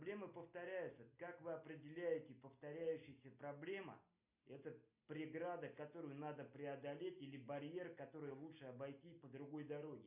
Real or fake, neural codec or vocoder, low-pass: real; none; 3.6 kHz